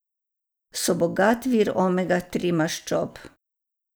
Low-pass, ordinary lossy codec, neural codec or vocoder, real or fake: none; none; none; real